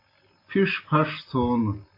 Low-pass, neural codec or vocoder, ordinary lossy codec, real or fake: 5.4 kHz; none; AAC, 32 kbps; real